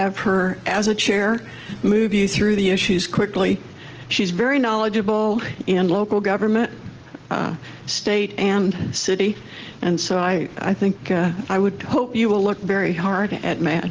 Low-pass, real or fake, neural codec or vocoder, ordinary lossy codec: 7.2 kHz; real; none; Opus, 16 kbps